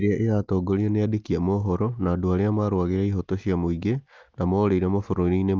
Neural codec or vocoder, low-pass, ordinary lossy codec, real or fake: none; 7.2 kHz; Opus, 32 kbps; real